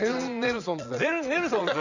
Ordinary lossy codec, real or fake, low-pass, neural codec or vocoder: none; fake; 7.2 kHz; vocoder, 22.05 kHz, 80 mel bands, Vocos